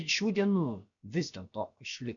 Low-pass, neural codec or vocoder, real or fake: 7.2 kHz; codec, 16 kHz, about 1 kbps, DyCAST, with the encoder's durations; fake